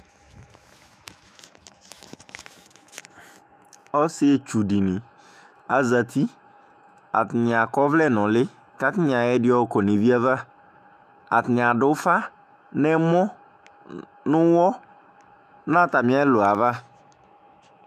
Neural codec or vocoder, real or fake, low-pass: autoencoder, 48 kHz, 128 numbers a frame, DAC-VAE, trained on Japanese speech; fake; 14.4 kHz